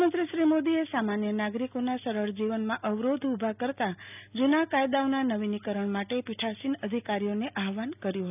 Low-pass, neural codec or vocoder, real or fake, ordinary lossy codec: 3.6 kHz; none; real; none